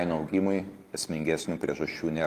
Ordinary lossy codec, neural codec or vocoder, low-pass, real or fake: Opus, 24 kbps; none; 14.4 kHz; real